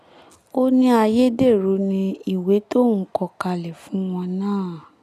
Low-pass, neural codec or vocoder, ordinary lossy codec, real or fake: 14.4 kHz; none; none; real